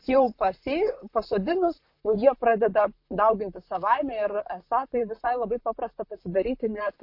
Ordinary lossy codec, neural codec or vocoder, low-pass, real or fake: MP3, 32 kbps; vocoder, 44.1 kHz, 128 mel bands, Pupu-Vocoder; 5.4 kHz; fake